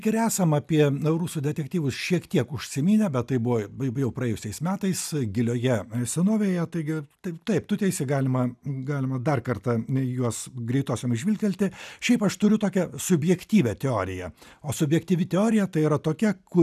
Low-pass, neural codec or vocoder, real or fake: 14.4 kHz; none; real